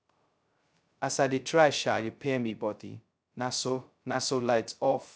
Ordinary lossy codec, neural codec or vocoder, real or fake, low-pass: none; codec, 16 kHz, 0.2 kbps, FocalCodec; fake; none